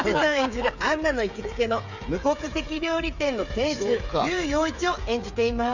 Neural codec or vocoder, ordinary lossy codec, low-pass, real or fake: codec, 16 kHz, 8 kbps, FreqCodec, smaller model; none; 7.2 kHz; fake